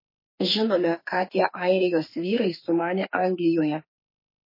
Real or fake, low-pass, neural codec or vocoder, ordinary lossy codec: fake; 5.4 kHz; autoencoder, 48 kHz, 32 numbers a frame, DAC-VAE, trained on Japanese speech; MP3, 24 kbps